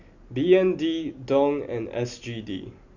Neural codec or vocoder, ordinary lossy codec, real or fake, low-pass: none; none; real; 7.2 kHz